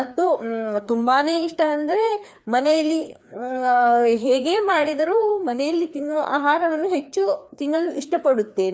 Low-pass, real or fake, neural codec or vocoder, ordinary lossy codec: none; fake; codec, 16 kHz, 2 kbps, FreqCodec, larger model; none